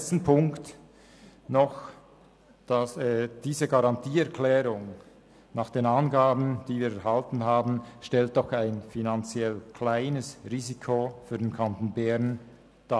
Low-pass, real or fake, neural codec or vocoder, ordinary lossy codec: none; real; none; none